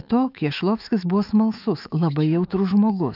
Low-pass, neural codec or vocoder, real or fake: 5.4 kHz; autoencoder, 48 kHz, 128 numbers a frame, DAC-VAE, trained on Japanese speech; fake